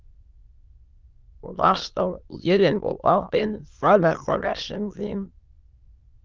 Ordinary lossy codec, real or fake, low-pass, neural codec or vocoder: Opus, 24 kbps; fake; 7.2 kHz; autoencoder, 22.05 kHz, a latent of 192 numbers a frame, VITS, trained on many speakers